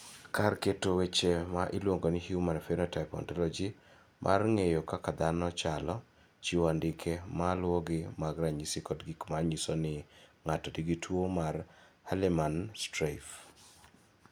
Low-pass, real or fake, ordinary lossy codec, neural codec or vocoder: none; real; none; none